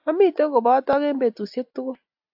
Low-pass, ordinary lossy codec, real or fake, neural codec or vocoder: 5.4 kHz; AAC, 48 kbps; real; none